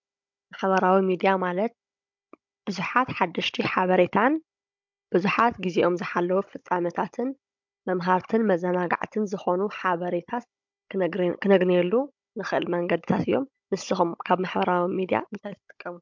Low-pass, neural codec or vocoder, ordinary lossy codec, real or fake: 7.2 kHz; codec, 16 kHz, 16 kbps, FunCodec, trained on Chinese and English, 50 frames a second; MP3, 64 kbps; fake